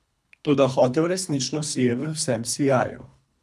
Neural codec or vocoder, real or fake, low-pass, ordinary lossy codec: codec, 24 kHz, 3 kbps, HILCodec; fake; none; none